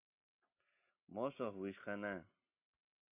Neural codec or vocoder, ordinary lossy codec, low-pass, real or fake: none; AAC, 32 kbps; 3.6 kHz; real